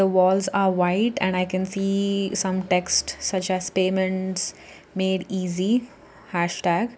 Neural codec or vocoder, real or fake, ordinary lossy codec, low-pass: none; real; none; none